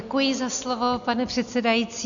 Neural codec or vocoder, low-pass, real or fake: none; 7.2 kHz; real